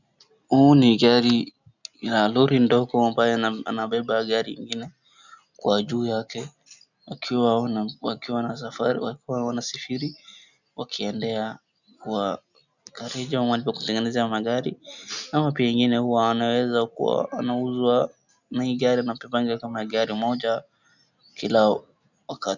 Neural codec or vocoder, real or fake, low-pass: none; real; 7.2 kHz